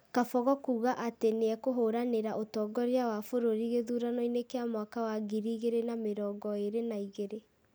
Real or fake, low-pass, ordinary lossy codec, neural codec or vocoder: real; none; none; none